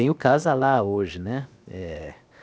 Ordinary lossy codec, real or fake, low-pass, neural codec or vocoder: none; fake; none; codec, 16 kHz, 0.7 kbps, FocalCodec